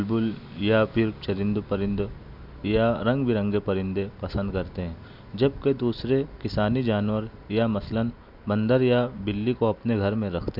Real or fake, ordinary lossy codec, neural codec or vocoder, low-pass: real; none; none; 5.4 kHz